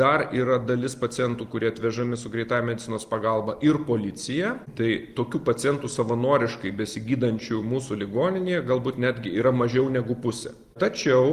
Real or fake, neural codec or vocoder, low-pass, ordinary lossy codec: real; none; 14.4 kHz; Opus, 24 kbps